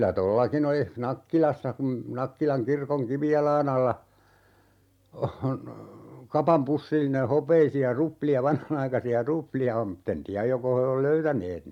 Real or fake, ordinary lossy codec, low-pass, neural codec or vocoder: real; MP3, 96 kbps; 19.8 kHz; none